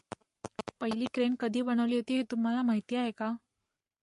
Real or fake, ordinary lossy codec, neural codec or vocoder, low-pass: fake; MP3, 48 kbps; codec, 44.1 kHz, 7.8 kbps, Pupu-Codec; 14.4 kHz